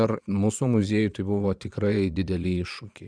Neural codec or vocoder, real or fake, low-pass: vocoder, 22.05 kHz, 80 mel bands, Vocos; fake; 9.9 kHz